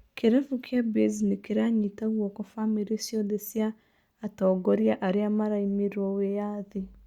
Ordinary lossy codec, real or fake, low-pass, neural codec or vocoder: Opus, 64 kbps; real; 19.8 kHz; none